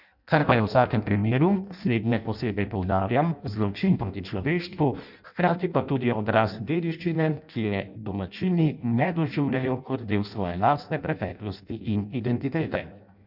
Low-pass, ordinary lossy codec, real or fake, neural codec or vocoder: 5.4 kHz; none; fake; codec, 16 kHz in and 24 kHz out, 0.6 kbps, FireRedTTS-2 codec